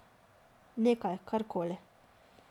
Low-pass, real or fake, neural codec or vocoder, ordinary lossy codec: 19.8 kHz; real; none; none